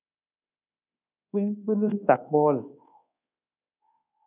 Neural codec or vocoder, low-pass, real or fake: codec, 24 kHz, 1.2 kbps, DualCodec; 3.6 kHz; fake